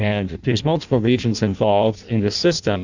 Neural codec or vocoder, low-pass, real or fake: codec, 16 kHz in and 24 kHz out, 0.6 kbps, FireRedTTS-2 codec; 7.2 kHz; fake